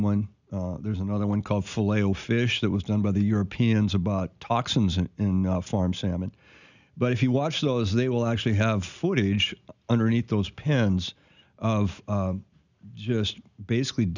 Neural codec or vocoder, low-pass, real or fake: none; 7.2 kHz; real